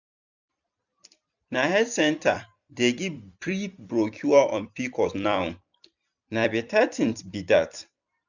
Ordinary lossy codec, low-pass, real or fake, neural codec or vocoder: none; 7.2 kHz; fake; vocoder, 44.1 kHz, 128 mel bands every 256 samples, BigVGAN v2